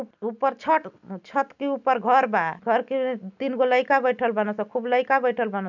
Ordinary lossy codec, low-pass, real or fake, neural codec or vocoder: none; 7.2 kHz; real; none